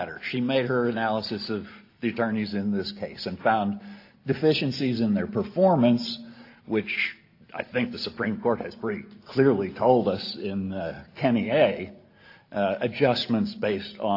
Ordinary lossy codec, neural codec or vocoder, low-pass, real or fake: AAC, 32 kbps; none; 5.4 kHz; real